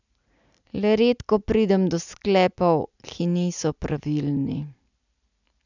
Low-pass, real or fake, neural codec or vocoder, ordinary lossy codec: 7.2 kHz; real; none; none